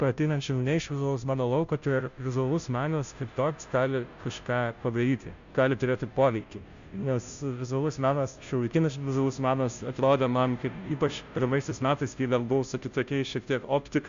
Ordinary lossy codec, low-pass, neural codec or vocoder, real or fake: Opus, 64 kbps; 7.2 kHz; codec, 16 kHz, 0.5 kbps, FunCodec, trained on Chinese and English, 25 frames a second; fake